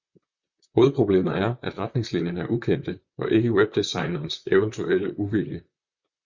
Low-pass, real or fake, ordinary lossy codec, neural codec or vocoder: 7.2 kHz; fake; Opus, 64 kbps; vocoder, 44.1 kHz, 128 mel bands, Pupu-Vocoder